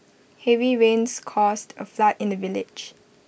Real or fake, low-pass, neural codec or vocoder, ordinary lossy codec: real; none; none; none